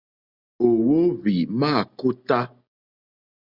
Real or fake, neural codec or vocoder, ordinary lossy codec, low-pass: real; none; Opus, 64 kbps; 5.4 kHz